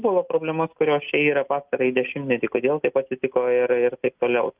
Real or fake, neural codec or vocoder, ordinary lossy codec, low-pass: real; none; Opus, 32 kbps; 3.6 kHz